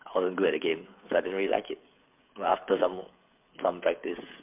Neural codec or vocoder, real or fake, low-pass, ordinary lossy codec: codec, 16 kHz, 8 kbps, FreqCodec, smaller model; fake; 3.6 kHz; MP3, 32 kbps